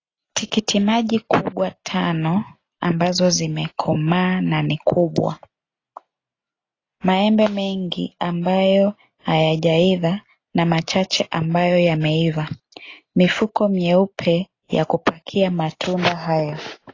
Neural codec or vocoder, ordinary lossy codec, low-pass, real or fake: none; AAC, 32 kbps; 7.2 kHz; real